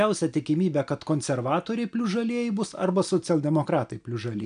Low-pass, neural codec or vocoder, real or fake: 9.9 kHz; none; real